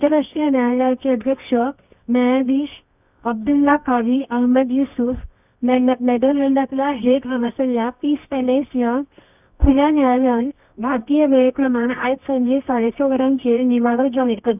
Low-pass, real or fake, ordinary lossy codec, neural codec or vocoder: 3.6 kHz; fake; none; codec, 24 kHz, 0.9 kbps, WavTokenizer, medium music audio release